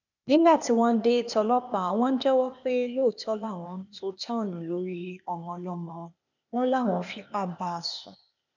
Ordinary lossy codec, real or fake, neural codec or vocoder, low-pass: none; fake; codec, 16 kHz, 0.8 kbps, ZipCodec; 7.2 kHz